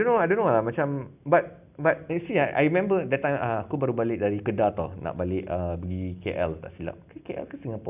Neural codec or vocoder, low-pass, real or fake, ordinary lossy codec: none; 3.6 kHz; real; none